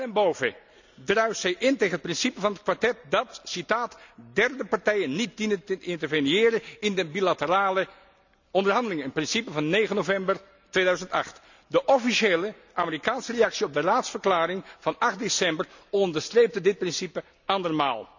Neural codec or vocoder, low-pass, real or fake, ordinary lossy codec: none; 7.2 kHz; real; none